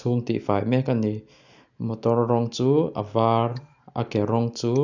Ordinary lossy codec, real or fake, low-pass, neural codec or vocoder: none; real; 7.2 kHz; none